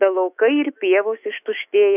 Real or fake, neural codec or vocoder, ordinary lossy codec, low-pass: fake; autoencoder, 48 kHz, 128 numbers a frame, DAC-VAE, trained on Japanese speech; AAC, 32 kbps; 3.6 kHz